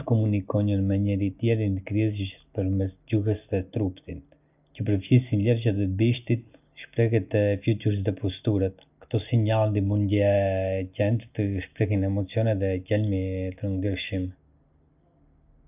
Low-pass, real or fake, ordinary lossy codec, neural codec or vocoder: 3.6 kHz; real; none; none